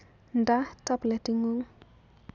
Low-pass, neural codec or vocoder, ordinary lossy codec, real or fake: 7.2 kHz; none; none; real